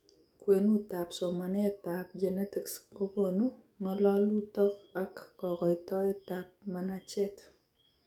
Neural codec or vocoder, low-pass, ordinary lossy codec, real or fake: codec, 44.1 kHz, 7.8 kbps, DAC; 19.8 kHz; none; fake